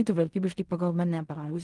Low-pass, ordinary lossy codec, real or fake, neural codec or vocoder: 10.8 kHz; Opus, 24 kbps; fake; codec, 16 kHz in and 24 kHz out, 0.4 kbps, LongCat-Audio-Codec, fine tuned four codebook decoder